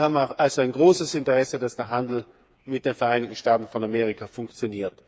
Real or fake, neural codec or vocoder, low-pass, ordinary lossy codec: fake; codec, 16 kHz, 4 kbps, FreqCodec, smaller model; none; none